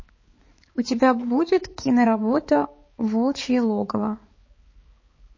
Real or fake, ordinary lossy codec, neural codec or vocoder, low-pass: fake; MP3, 32 kbps; codec, 16 kHz, 4 kbps, X-Codec, HuBERT features, trained on balanced general audio; 7.2 kHz